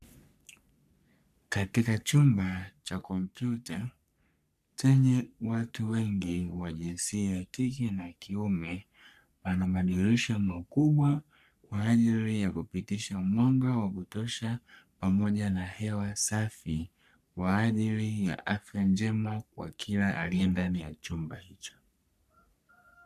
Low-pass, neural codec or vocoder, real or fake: 14.4 kHz; codec, 44.1 kHz, 3.4 kbps, Pupu-Codec; fake